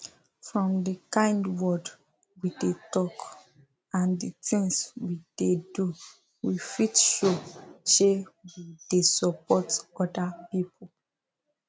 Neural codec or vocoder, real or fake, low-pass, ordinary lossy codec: none; real; none; none